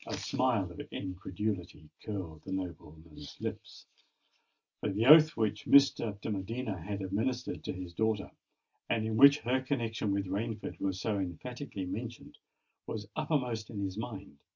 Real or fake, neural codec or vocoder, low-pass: real; none; 7.2 kHz